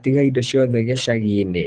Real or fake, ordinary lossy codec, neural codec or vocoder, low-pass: fake; Opus, 24 kbps; codec, 24 kHz, 6 kbps, HILCodec; 9.9 kHz